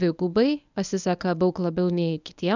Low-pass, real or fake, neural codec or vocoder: 7.2 kHz; fake; codec, 24 kHz, 0.9 kbps, WavTokenizer, medium speech release version 1